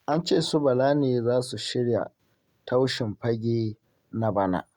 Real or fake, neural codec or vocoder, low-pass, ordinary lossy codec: real; none; 19.8 kHz; Opus, 64 kbps